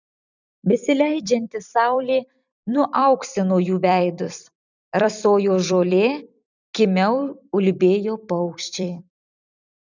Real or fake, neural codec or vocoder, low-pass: fake; vocoder, 44.1 kHz, 128 mel bands every 256 samples, BigVGAN v2; 7.2 kHz